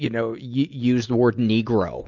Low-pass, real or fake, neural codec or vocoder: 7.2 kHz; real; none